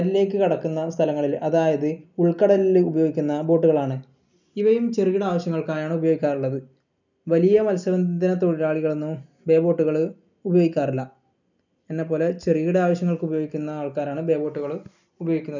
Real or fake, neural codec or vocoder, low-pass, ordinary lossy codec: real; none; 7.2 kHz; none